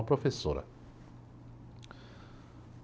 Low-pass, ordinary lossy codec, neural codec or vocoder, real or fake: none; none; none; real